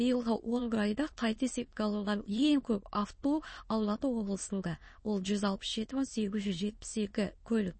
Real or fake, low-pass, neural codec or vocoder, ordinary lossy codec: fake; 9.9 kHz; autoencoder, 22.05 kHz, a latent of 192 numbers a frame, VITS, trained on many speakers; MP3, 32 kbps